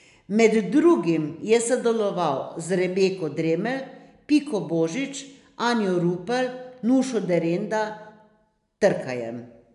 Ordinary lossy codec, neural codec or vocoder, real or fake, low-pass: none; none; real; 10.8 kHz